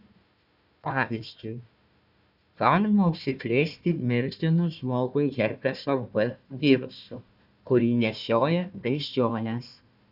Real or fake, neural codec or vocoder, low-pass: fake; codec, 16 kHz, 1 kbps, FunCodec, trained on Chinese and English, 50 frames a second; 5.4 kHz